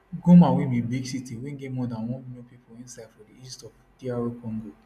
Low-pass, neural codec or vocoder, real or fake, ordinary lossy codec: 14.4 kHz; none; real; none